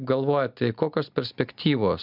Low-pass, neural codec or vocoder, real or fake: 5.4 kHz; none; real